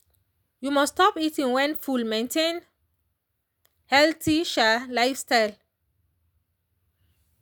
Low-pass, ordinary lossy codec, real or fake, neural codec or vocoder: none; none; real; none